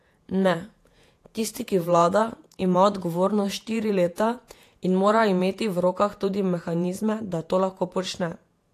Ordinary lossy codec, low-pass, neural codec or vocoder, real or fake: AAC, 64 kbps; 14.4 kHz; vocoder, 48 kHz, 128 mel bands, Vocos; fake